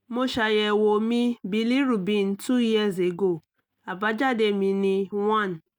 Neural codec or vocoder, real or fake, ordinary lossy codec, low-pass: none; real; none; 19.8 kHz